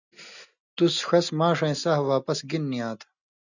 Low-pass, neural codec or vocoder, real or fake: 7.2 kHz; none; real